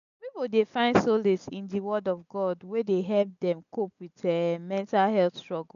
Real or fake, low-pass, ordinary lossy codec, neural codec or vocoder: real; 7.2 kHz; none; none